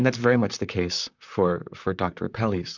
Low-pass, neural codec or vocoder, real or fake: 7.2 kHz; vocoder, 44.1 kHz, 128 mel bands, Pupu-Vocoder; fake